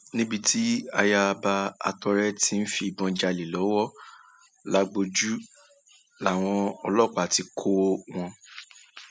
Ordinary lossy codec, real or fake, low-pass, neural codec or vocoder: none; real; none; none